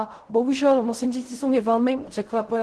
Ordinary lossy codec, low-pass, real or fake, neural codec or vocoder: Opus, 16 kbps; 10.8 kHz; fake; codec, 16 kHz in and 24 kHz out, 0.4 kbps, LongCat-Audio-Codec, fine tuned four codebook decoder